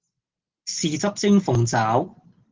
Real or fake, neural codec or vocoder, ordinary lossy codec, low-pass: real; none; Opus, 16 kbps; 7.2 kHz